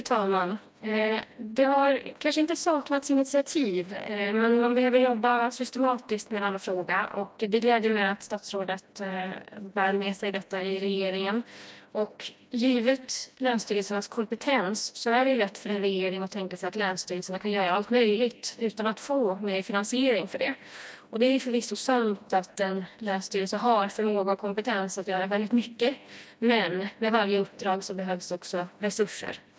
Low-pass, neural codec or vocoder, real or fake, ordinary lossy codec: none; codec, 16 kHz, 1 kbps, FreqCodec, smaller model; fake; none